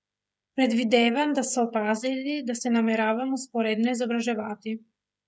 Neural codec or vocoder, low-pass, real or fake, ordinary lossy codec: codec, 16 kHz, 16 kbps, FreqCodec, smaller model; none; fake; none